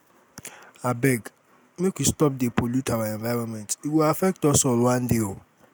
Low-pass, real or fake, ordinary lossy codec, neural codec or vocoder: none; real; none; none